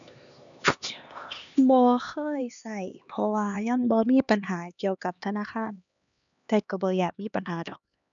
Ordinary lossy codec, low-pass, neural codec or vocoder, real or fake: none; 7.2 kHz; codec, 16 kHz, 2 kbps, X-Codec, HuBERT features, trained on LibriSpeech; fake